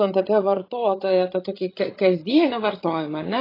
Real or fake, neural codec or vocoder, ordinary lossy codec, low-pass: fake; codec, 16 kHz, 16 kbps, FreqCodec, larger model; AAC, 24 kbps; 5.4 kHz